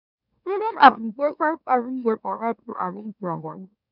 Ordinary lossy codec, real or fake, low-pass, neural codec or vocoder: none; fake; 5.4 kHz; autoencoder, 44.1 kHz, a latent of 192 numbers a frame, MeloTTS